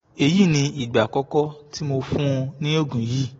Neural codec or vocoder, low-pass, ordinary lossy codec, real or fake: none; 19.8 kHz; AAC, 24 kbps; real